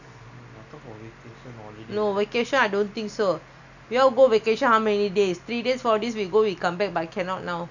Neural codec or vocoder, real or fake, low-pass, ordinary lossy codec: none; real; 7.2 kHz; none